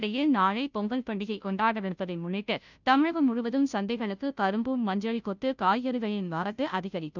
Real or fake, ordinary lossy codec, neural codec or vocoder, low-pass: fake; none; codec, 16 kHz, 0.5 kbps, FunCodec, trained on Chinese and English, 25 frames a second; 7.2 kHz